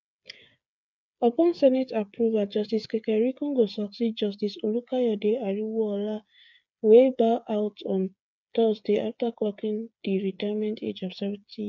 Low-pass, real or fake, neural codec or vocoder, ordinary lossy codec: 7.2 kHz; fake; codec, 16 kHz, 8 kbps, FreqCodec, smaller model; none